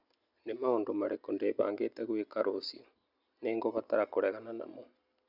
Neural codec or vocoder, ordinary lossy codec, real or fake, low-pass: none; none; real; 5.4 kHz